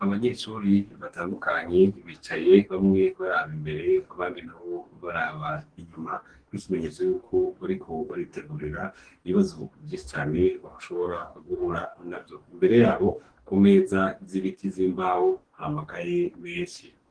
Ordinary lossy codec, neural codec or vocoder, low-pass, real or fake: Opus, 16 kbps; codec, 44.1 kHz, 2.6 kbps, DAC; 9.9 kHz; fake